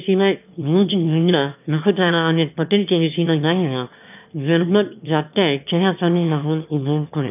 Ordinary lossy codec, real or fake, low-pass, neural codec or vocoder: none; fake; 3.6 kHz; autoencoder, 22.05 kHz, a latent of 192 numbers a frame, VITS, trained on one speaker